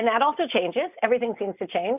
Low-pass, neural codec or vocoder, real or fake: 3.6 kHz; none; real